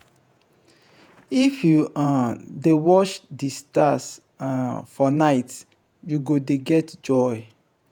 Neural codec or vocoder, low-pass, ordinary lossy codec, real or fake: vocoder, 48 kHz, 128 mel bands, Vocos; 19.8 kHz; none; fake